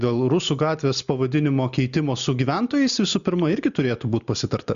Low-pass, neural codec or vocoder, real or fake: 7.2 kHz; none; real